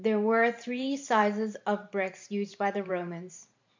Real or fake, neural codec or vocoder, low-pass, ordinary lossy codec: fake; vocoder, 44.1 kHz, 128 mel bands every 512 samples, BigVGAN v2; 7.2 kHz; MP3, 64 kbps